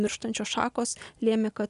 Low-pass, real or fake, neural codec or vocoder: 10.8 kHz; real; none